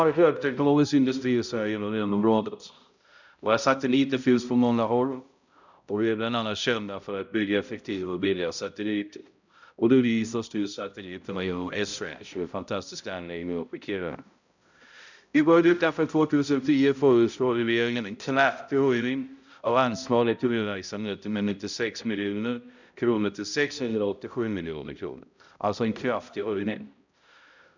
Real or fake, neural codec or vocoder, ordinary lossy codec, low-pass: fake; codec, 16 kHz, 0.5 kbps, X-Codec, HuBERT features, trained on balanced general audio; none; 7.2 kHz